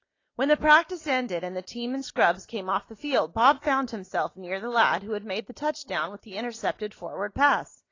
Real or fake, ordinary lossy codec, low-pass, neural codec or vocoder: real; AAC, 32 kbps; 7.2 kHz; none